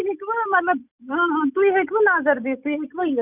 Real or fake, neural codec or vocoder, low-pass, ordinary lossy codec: real; none; 3.6 kHz; none